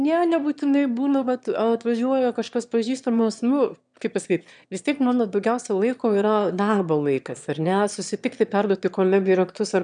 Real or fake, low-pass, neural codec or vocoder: fake; 9.9 kHz; autoencoder, 22.05 kHz, a latent of 192 numbers a frame, VITS, trained on one speaker